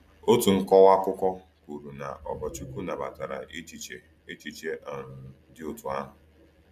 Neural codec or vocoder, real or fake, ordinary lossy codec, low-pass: none; real; none; 14.4 kHz